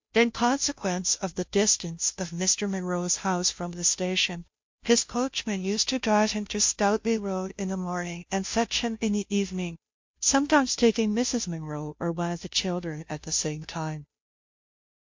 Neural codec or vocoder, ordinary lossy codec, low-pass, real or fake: codec, 16 kHz, 0.5 kbps, FunCodec, trained on Chinese and English, 25 frames a second; MP3, 48 kbps; 7.2 kHz; fake